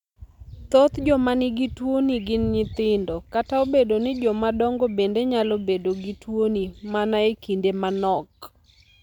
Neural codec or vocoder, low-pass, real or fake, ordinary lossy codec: none; 19.8 kHz; real; none